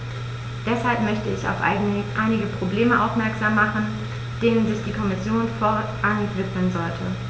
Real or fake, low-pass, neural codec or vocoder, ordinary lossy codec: real; none; none; none